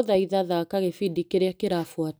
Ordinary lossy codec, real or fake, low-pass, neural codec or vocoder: none; real; none; none